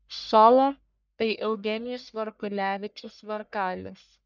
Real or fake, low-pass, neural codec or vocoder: fake; 7.2 kHz; codec, 44.1 kHz, 1.7 kbps, Pupu-Codec